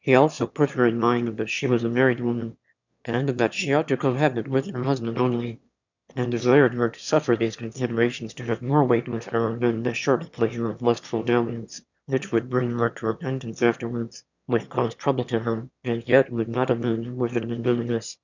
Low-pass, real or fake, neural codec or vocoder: 7.2 kHz; fake; autoencoder, 22.05 kHz, a latent of 192 numbers a frame, VITS, trained on one speaker